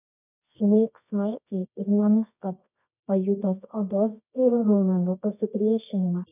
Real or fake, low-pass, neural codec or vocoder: fake; 3.6 kHz; codec, 24 kHz, 0.9 kbps, WavTokenizer, medium music audio release